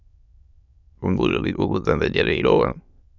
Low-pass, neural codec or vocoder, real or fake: 7.2 kHz; autoencoder, 22.05 kHz, a latent of 192 numbers a frame, VITS, trained on many speakers; fake